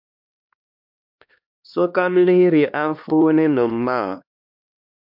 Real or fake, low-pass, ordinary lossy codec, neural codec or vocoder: fake; 5.4 kHz; MP3, 48 kbps; codec, 16 kHz, 2 kbps, X-Codec, HuBERT features, trained on LibriSpeech